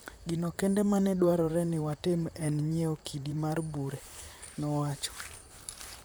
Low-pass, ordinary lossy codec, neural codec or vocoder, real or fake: none; none; vocoder, 44.1 kHz, 128 mel bands, Pupu-Vocoder; fake